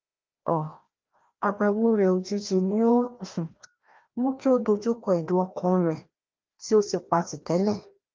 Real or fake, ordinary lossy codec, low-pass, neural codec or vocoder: fake; Opus, 32 kbps; 7.2 kHz; codec, 16 kHz, 1 kbps, FreqCodec, larger model